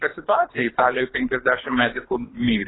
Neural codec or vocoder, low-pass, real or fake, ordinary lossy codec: codec, 24 kHz, 3 kbps, HILCodec; 7.2 kHz; fake; AAC, 16 kbps